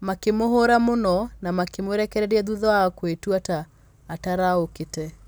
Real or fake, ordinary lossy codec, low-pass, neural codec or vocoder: real; none; none; none